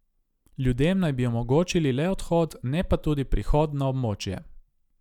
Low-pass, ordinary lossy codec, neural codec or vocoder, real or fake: 19.8 kHz; none; none; real